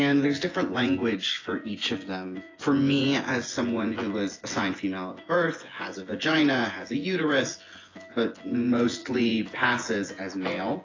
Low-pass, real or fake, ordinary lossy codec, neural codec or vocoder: 7.2 kHz; fake; AAC, 32 kbps; vocoder, 24 kHz, 100 mel bands, Vocos